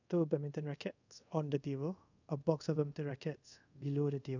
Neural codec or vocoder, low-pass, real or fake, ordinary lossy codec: codec, 24 kHz, 0.5 kbps, DualCodec; 7.2 kHz; fake; none